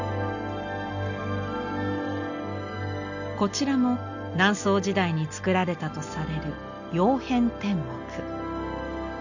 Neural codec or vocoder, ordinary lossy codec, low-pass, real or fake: none; none; 7.2 kHz; real